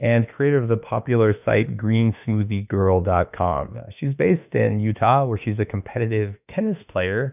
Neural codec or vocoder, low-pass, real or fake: autoencoder, 48 kHz, 32 numbers a frame, DAC-VAE, trained on Japanese speech; 3.6 kHz; fake